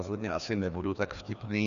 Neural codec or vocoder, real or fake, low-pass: codec, 16 kHz, 2 kbps, FreqCodec, larger model; fake; 7.2 kHz